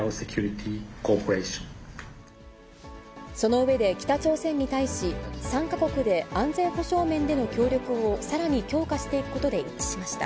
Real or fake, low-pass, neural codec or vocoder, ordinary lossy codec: real; none; none; none